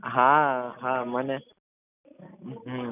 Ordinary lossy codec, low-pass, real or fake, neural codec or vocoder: none; 3.6 kHz; real; none